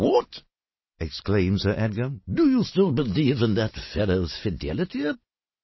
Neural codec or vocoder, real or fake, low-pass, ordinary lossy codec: none; real; 7.2 kHz; MP3, 24 kbps